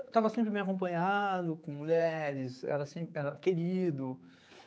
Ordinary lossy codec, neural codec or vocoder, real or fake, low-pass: none; codec, 16 kHz, 4 kbps, X-Codec, HuBERT features, trained on general audio; fake; none